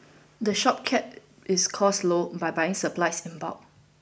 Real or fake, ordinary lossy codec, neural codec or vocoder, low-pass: real; none; none; none